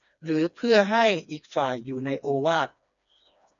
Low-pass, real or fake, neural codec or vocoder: 7.2 kHz; fake; codec, 16 kHz, 2 kbps, FreqCodec, smaller model